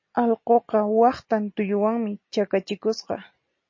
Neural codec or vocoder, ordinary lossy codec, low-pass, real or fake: none; MP3, 32 kbps; 7.2 kHz; real